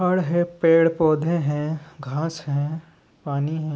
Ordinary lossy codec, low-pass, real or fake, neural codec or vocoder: none; none; real; none